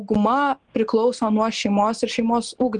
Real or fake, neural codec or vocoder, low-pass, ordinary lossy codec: real; none; 9.9 kHz; Opus, 24 kbps